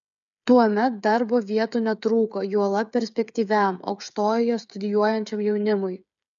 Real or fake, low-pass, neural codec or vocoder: fake; 7.2 kHz; codec, 16 kHz, 8 kbps, FreqCodec, smaller model